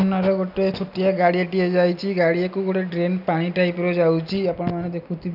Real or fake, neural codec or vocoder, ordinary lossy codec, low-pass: real; none; none; 5.4 kHz